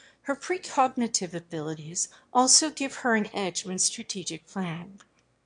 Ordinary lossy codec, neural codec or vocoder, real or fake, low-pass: MP3, 64 kbps; autoencoder, 22.05 kHz, a latent of 192 numbers a frame, VITS, trained on one speaker; fake; 9.9 kHz